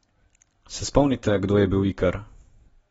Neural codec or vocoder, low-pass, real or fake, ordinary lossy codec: none; 19.8 kHz; real; AAC, 24 kbps